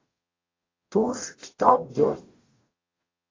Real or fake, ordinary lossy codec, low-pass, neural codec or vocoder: fake; AAC, 32 kbps; 7.2 kHz; codec, 44.1 kHz, 0.9 kbps, DAC